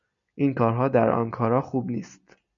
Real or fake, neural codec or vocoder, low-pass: real; none; 7.2 kHz